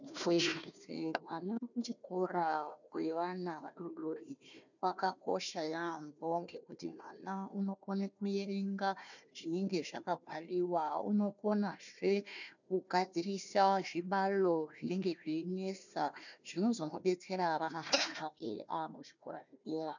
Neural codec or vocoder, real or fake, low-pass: codec, 16 kHz, 1 kbps, FunCodec, trained on Chinese and English, 50 frames a second; fake; 7.2 kHz